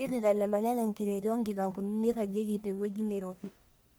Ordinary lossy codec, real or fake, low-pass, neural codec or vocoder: none; fake; none; codec, 44.1 kHz, 1.7 kbps, Pupu-Codec